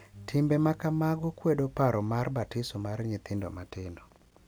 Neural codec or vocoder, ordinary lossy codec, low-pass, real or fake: none; none; none; real